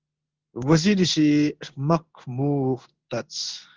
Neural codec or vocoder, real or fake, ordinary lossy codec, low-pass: codec, 16 kHz in and 24 kHz out, 1 kbps, XY-Tokenizer; fake; Opus, 16 kbps; 7.2 kHz